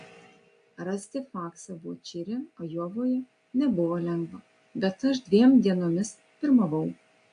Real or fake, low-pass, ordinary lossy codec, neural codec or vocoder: real; 9.9 kHz; AAC, 64 kbps; none